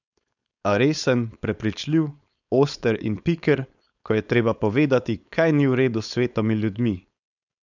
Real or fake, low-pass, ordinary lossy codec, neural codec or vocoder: fake; 7.2 kHz; none; codec, 16 kHz, 4.8 kbps, FACodec